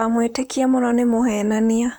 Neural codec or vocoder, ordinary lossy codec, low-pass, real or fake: vocoder, 44.1 kHz, 128 mel bands, Pupu-Vocoder; none; none; fake